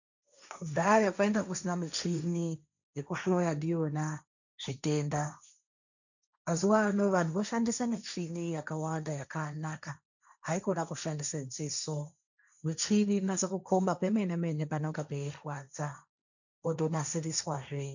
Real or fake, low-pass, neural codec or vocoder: fake; 7.2 kHz; codec, 16 kHz, 1.1 kbps, Voila-Tokenizer